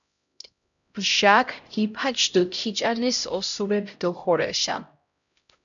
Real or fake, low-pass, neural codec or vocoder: fake; 7.2 kHz; codec, 16 kHz, 0.5 kbps, X-Codec, HuBERT features, trained on LibriSpeech